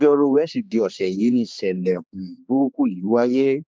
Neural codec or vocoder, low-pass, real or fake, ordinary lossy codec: codec, 16 kHz, 2 kbps, X-Codec, HuBERT features, trained on general audio; none; fake; none